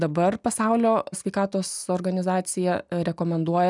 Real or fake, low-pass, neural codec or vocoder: real; 10.8 kHz; none